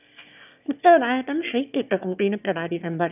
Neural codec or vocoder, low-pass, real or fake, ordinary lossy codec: autoencoder, 22.05 kHz, a latent of 192 numbers a frame, VITS, trained on one speaker; 3.6 kHz; fake; none